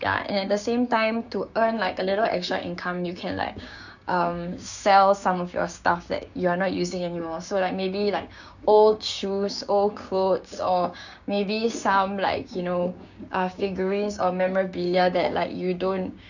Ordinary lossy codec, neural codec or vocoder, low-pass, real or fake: none; codec, 16 kHz, 6 kbps, DAC; 7.2 kHz; fake